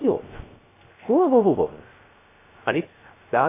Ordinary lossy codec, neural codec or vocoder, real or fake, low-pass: AAC, 24 kbps; codec, 16 kHz, 0.3 kbps, FocalCodec; fake; 3.6 kHz